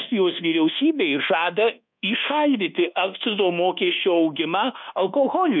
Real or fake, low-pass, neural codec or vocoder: fake; 7.2 kHz; codec, 24 kHz, 1.2 kbps, DualCodec